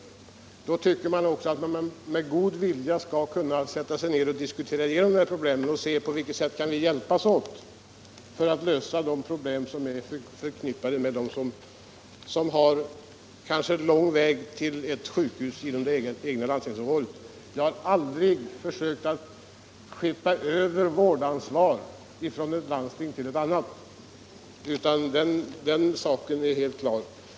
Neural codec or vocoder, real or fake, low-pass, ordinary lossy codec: none; real; none; none